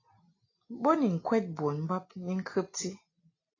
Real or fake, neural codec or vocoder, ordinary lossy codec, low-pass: real; none; AAC, 32 kbps; 7.2 kHz